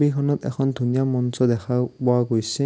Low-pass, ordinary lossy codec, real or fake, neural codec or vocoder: none; none; real; none